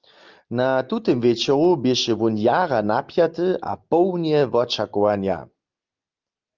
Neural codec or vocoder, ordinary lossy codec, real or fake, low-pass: none; Opus, 32 kbps; real; 7.2 kHz